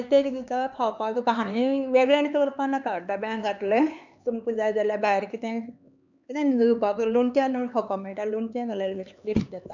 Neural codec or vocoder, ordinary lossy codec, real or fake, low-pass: codec, 16 kHz, 4 kbps, X-Codec, HuBERT features, trained on LibriSpeech; none; fake; 7.2 kHz